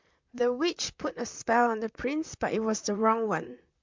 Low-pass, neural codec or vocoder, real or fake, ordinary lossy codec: 7.2 kHz; vocoder, 44.1 kHz, 128 mel bands, Pupu-Vocoder; fake; MP3, 64 kbps